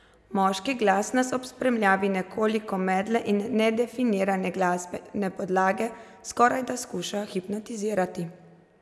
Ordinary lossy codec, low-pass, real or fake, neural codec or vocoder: none; none; real; none